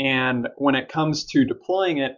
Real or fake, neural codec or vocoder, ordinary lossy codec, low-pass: real; none; MP3, 64 kbps; 7.2 kHz